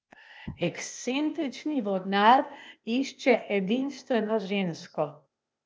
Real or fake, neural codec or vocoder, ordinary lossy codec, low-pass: fake; codec, 16 kHz, 0.8 kbps, ZipCodec; none; none